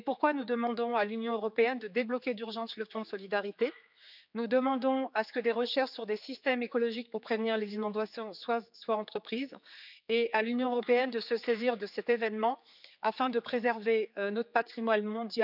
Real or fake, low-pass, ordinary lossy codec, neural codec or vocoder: fake; 5.4 kHz; none; codec, 16 kHz, 4 kbps, X-Codec, HuBERT features, trained on general audio